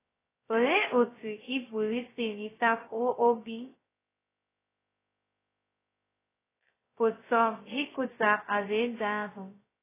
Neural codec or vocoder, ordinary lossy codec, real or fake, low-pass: codec, 16 kHz, 0.2 kbps, FocalCodec; AAC, 16 kbps; fake; 3.6 kHz